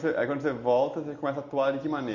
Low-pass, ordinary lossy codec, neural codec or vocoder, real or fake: 7.2 kHz; none; none; real